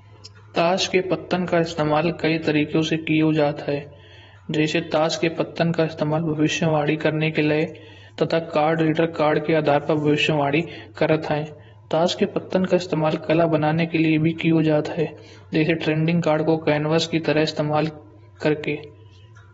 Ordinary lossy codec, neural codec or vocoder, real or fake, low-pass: AAC, 24 kbps; vocoder, 48 kHz, 128 mel bands, Vocos; fake; 19.8 kHz